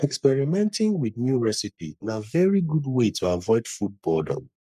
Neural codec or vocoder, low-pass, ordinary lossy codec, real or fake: codec, 44.1 kHz, 3.4 kbps, Pupu-Codec; 14.4 kHz; none; fake